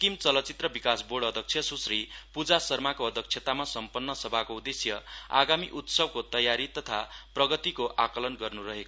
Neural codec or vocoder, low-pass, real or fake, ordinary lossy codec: none; none; real; none